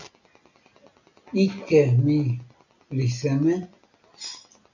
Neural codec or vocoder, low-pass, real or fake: none; 7.2 kHz; real